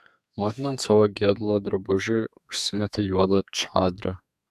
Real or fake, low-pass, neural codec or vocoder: fake; 14.4 kHz; codec, 32 kHz, 1.9 kbps, SNAC